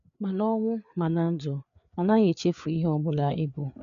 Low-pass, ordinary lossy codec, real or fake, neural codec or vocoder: 7.2 kHz; none; fake; codec, 16 kHz, 4 kbps, FreqCodec, larger model